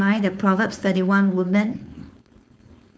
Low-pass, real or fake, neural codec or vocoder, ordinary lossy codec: none; fake; codec, 16 kHz, 4.8 kbps, FACodec; none